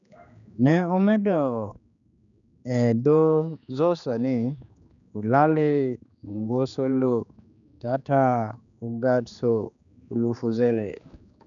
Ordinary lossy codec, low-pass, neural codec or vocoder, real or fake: none; 7.2 kHz; codec, 16 kHz, 2 kbps, X-Codec, HuBERT features, trained on general audio; fake